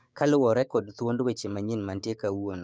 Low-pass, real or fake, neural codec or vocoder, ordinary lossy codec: none; fake; codec, 16 kHz, 6 kbps, DAC; none